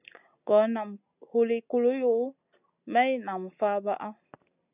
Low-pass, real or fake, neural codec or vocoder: 3.6 kHz; real; none